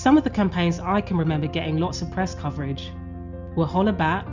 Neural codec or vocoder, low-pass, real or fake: none; 7.2 kHz; real